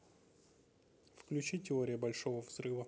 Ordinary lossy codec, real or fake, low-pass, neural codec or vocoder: none; real; none; none